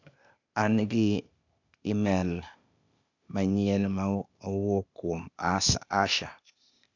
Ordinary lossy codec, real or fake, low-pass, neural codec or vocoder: none; fake; 7.2 kHz; codec, 16 kHz, 0.8 kbps, ZipCodec